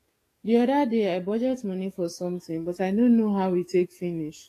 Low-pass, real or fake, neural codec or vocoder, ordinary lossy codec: 14.4 kHz; fake; codec, 44.1 kHz, 7.8 kbps, DAC; AAC, 48 kbps